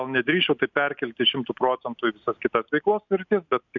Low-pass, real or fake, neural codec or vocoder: 7.2 kHz; real; none